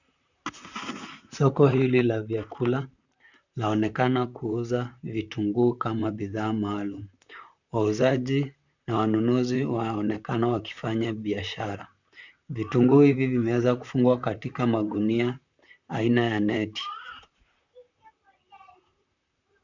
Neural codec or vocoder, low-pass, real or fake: vocoder, 44.1 kHz, 128 mel bands, Pupu-Vocoder; 7.2 kHz; fake